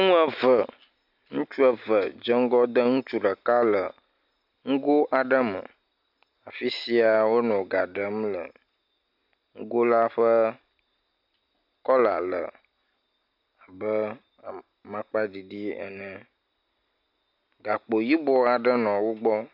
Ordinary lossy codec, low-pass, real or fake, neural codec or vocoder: MP3, 48 kbps; 5.4 kHz; real; none